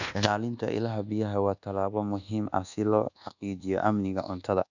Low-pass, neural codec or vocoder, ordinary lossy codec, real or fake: 7.2 kHz; codec, 24 kHz, 1.2 kbps, DualCodec; none; fake